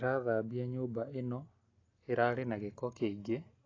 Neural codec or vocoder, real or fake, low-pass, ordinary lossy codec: none; real; 7.2 kHz; AAC, 32 kbps